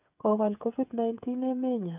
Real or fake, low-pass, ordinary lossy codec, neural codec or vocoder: fake; 3.6 kHz; none; codec, 16 kHz, 8 kbps, FreqCodec, smaller model